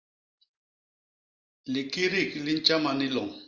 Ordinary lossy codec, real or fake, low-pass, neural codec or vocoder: Opus, 32 kbps; real; 7.2 kHz; none